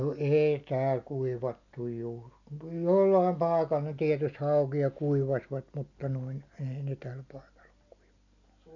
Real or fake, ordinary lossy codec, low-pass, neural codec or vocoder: real; none; 7.2 kHz; none